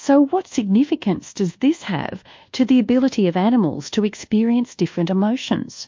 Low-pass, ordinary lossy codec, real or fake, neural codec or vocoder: 7.2 kHz; MP3, 48 kbps; fake; codec, 24 kHz, 1.2 kbps, DualCodec